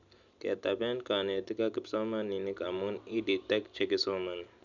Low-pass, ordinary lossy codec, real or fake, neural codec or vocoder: 7.2 kHz; none; real; none